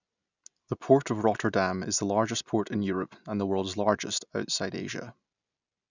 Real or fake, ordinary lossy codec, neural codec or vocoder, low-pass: real; none; none; 7.2 kHz